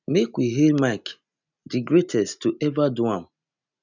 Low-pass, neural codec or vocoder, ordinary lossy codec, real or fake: 7.2 kHz; none; none; real